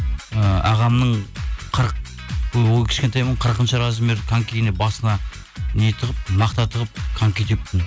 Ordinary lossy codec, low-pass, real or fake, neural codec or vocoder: none; none; real; none